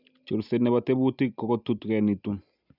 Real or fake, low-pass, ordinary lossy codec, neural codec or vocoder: real; 5.4 kHz; none; none